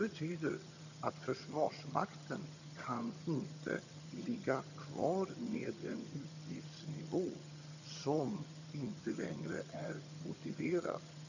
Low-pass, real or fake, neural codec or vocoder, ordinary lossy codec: 7.2 kHz; fake; vocoder, 22.05 kHz, 80 mel bands, HiFi-GAN; none